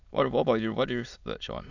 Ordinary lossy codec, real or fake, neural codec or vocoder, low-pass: none; fake; autoencoder, 22.05 kHz, a latent of 192 numbers a frame, VITS, trained on many speakers; 7.2 kHz